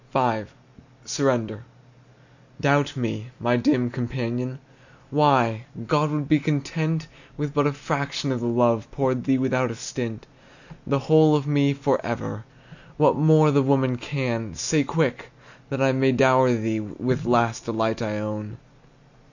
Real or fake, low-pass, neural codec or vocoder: real; 7.2 kHz; none